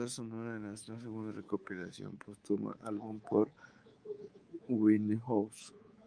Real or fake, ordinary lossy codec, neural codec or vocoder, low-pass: fake; Opus, 32 kbps; codec, 24 kHz, 3.1 kbps, DualCodec; 9.9 kHz